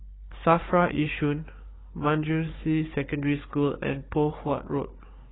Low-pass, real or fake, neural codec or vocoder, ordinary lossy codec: 7.2 kHz; fake; codec, 16 kHz, 4 kbps, FunCodec, trained on Chinese and English, 50 frames a second; AAC, 16 kbps